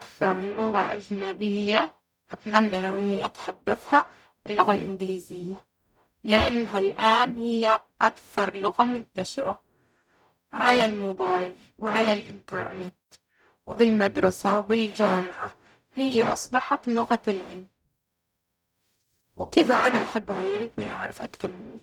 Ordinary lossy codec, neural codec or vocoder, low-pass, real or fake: none; codec, 44.1 kHz, 0.9 kbps, DAC; 19.8 kHz; fake